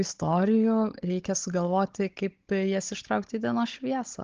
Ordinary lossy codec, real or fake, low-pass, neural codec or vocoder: Opus, 16 kbps; fake; 7.2 kHz; codec, 16 kHz, 16 kbps, FunCodec, trained on Chinese and English, 50 frames a second